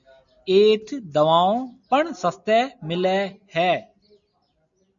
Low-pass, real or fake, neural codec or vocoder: 7.2 kHz; real; none